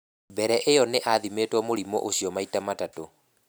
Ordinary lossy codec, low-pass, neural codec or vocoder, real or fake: none; none; none; real